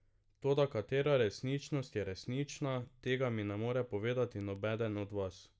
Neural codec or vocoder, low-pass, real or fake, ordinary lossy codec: none; none; real; none